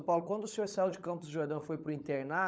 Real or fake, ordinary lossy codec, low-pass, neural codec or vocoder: fake; none; none; codec, 16 kHz, 16 kbps, FunCodec, trained on LibriTTS, 50 frames a second